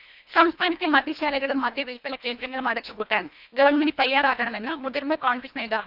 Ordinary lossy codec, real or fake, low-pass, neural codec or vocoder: none; fake; 5.4 kHz; codec, 24 kHz, 1.5 kbps, HILCodec